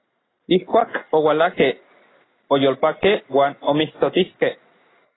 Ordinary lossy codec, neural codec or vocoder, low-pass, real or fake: AAC, 16 kbps; none; 7.2 kHz; real